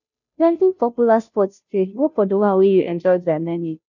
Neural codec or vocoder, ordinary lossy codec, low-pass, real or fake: codec, 16 kHz, 0.5 kbps, FunCodec, trained on Chinese and English, 25 frames a second; none; 7.2 kHz; fake